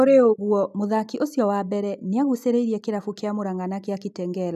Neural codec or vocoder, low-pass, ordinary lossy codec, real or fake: none; 14.4 kHz; none; real